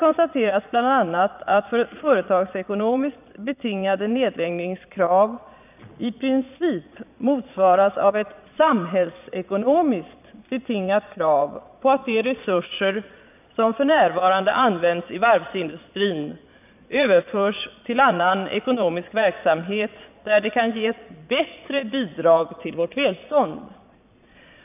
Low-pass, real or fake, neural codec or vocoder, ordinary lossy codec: 3.6 kHz; fake; vocoder, 22.05 kHz, 80 mel bands, Vocos; none